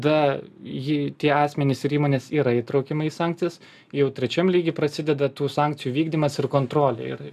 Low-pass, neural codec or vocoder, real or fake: 14.4 kHz; none; real